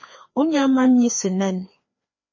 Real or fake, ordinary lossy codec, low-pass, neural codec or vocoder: fake; MP3, 32 kbps; 7.2 kHz; codec, 16 kHz, 2 kbps, FreqCodec, larger model